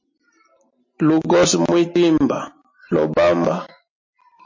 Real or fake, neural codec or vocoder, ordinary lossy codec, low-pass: real; none; MP3, 32 kbps; 7.2 kHz